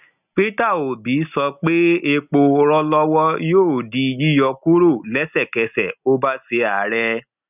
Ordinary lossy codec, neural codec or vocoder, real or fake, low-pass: none; none; real; 3.6 kHz